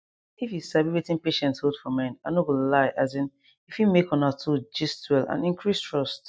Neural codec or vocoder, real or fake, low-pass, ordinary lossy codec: none; real; none; none